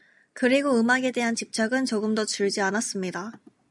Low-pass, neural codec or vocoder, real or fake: 10.8 kHz; none; real